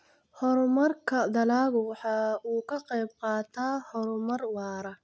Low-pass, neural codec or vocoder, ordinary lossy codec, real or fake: none; none; none; real